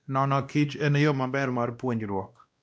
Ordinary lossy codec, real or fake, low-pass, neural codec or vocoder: none; fake; none; codec, 16 kHz, 1 kbps, X-Codec, WavLM features, trained on Multilingual LibriSpeech